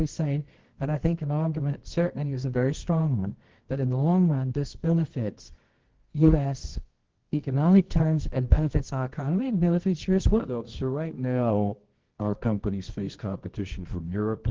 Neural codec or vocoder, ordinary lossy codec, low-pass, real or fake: codec, 24 kHz, 0.9 kbps, WavTokenizer, medium music audio release; Opus, 16 kbps; 7.2 kHz; fake